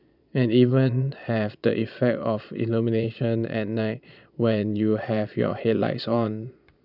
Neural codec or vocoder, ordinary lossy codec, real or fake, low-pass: vocoder, 22.05 kHz, 80 mel bands, Vocos; none; fake; 5.4 kHz